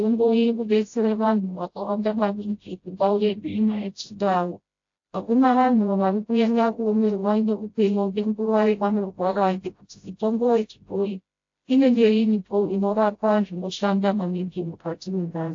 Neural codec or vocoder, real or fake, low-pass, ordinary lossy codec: codec, 16 kHz, 0.5 kbps, FreqCodec, smaller model; fake; 7.2 kHz; AAC, 48 kbps